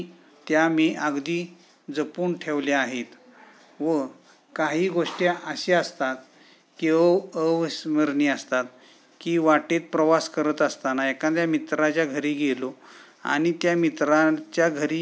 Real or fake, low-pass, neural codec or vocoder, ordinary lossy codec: real; none; none; none